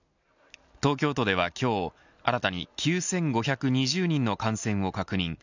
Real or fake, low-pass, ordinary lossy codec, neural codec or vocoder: real; 7.2 kHz; none; none